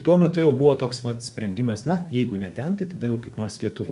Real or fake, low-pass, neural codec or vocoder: fake; 10.8 kHz; codec, 24 kHz, 1 kbps, SNAC